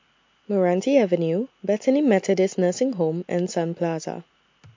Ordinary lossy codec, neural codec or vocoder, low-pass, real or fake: MP3, 48 kbps; none; 7.2 kHz; real